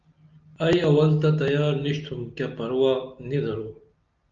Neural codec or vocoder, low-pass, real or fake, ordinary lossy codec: none; 7.2 kHz; real; Opus, 24 kbps